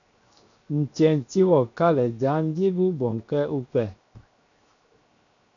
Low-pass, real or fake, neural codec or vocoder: 7.2 kHz; fake; codec, 16 kHz, 0.7 kbps, FocalCodec